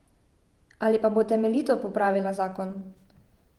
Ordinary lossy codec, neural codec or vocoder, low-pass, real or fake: Opus, 24 kbps; vocoder, 48 kHz, 128 mel bands, Vocos; 19.8 kHz; fake